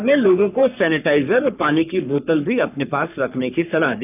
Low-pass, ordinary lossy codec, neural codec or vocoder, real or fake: 3.6 kHz; none; codec, 44.1 kHz, 3.4 kbps, Pupu-Codec; fake